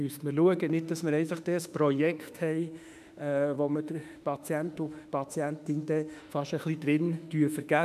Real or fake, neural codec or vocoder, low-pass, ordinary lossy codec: fake; autoencoder, 48 kHz, 32 numbers a frame, DAC-VAE, trained on Japanese speech; 14.4 kHz; none